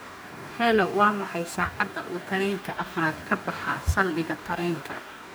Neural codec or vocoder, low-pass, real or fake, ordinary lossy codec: codec, 44.1 kHz, 2.6 kbps, DAC; none; fake; none